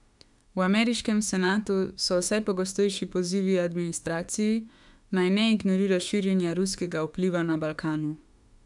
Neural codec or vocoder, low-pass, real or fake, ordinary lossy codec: autoencoder, 48 kHz, 32 numbers a frame, DAC-VAE, trained on Japanese speech; 10.8 kHz; fake; none